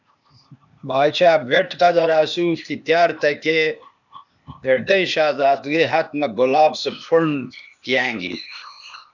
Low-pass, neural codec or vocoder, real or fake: 7.2 kHz; codec, 16 kHz, 0.8 kbps, ZipCodec; fake